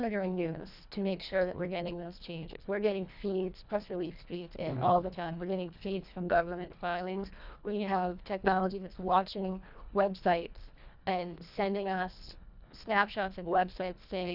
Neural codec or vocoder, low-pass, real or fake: codec, 24 kHz, 1.5 kbps, HILCodec; 5.4 kHz; fake